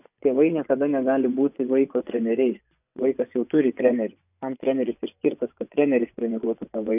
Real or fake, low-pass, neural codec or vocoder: fake; 3.6 kHz; vocoder, 24 kHz, 100 mel bands, Vocos